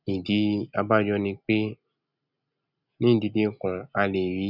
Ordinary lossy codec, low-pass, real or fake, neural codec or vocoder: none; 5.4 kHz; real; none